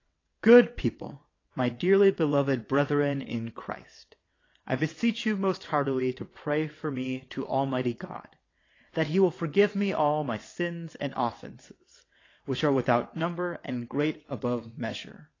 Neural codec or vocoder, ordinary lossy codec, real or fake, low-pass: vocoder, 22.05 kHz, 80 mel bands, WaveNeXt; AAC, 32 kbps; fake; 7.2 kHz